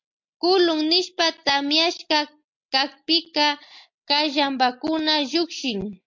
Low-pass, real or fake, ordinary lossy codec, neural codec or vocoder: 7.2 kHz; real; MP3, 48 kbps; none